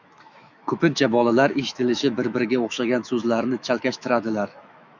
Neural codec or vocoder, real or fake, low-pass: autoencoder, 48 kHz, 128 numbers a frame, DAC-VAE, trained on Japanese speech; fake; 7.2 kHz